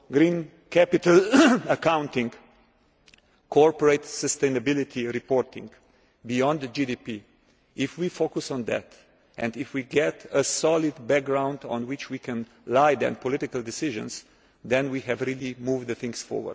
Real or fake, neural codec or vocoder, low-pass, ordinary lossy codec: real; none; none; none